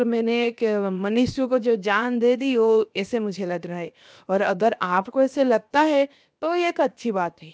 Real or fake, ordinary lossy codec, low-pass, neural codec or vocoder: fake; none; none; codec, 16 kHz, 0.7 kbps, FocalCodec